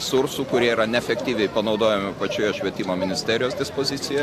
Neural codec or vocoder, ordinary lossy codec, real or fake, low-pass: none; AAC, 64 kbps; real; 14.4 kHz